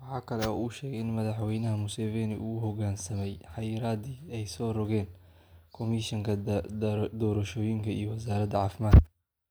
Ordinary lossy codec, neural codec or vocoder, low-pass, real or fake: none; none; none; real